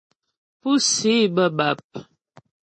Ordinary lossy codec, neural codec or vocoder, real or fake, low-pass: MP3, 32 kbps; none; real; 10.8 kHz